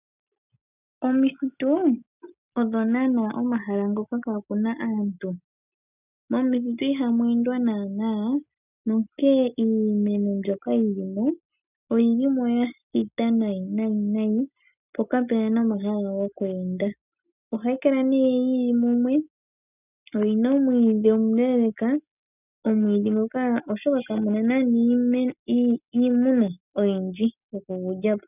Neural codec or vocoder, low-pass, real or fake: none; 3.6 kHz; real